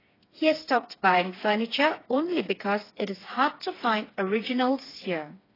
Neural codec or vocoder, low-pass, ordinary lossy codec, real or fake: codec, 16 kHz, 4 kbps, FreqCodec, smaller model; 5.4 kHz; AAC, 24 kbps; fake